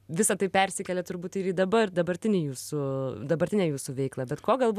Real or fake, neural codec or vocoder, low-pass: real; none; 14.4 kHz